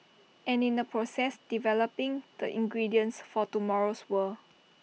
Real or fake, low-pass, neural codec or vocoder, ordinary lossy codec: real; none; none; none